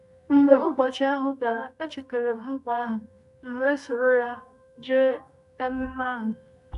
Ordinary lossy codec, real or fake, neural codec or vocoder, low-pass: none; fake; codec, 24 kHz, 0.9 kbps, WavTokenizer, medium music audio release; 10.8 kHz